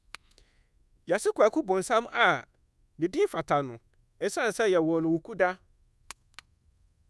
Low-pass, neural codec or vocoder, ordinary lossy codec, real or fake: none; codec, 24 kHz, 1.2 kbps, DualCodec; none; fake